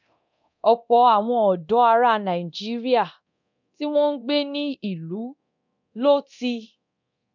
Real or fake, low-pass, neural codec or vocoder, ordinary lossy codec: fake; 7.2 kHz; codec, 24 kHz, 0.9 kbps, DualCodec; none